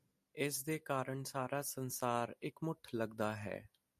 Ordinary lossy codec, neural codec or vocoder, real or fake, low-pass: MP3, 64 kbps; none; real; 14.4 kHz